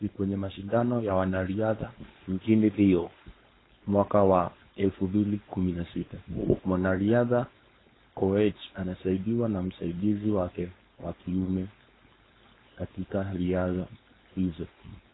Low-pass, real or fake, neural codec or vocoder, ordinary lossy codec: 7.2 kHz; fake; codec, 16 kHz, 4.8 kbps, FACodec; AAC, 16 kbps